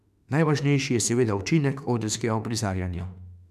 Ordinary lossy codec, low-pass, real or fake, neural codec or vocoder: none; 14.4 kHz; fake; autoencoder, 48 kHz, 32 numbers a frame, DAC-VAE, trained on Japanese speech